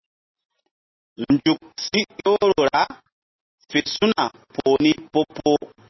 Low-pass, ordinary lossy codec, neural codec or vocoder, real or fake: 7.2 kHz; MP3, 24 kbps; none; real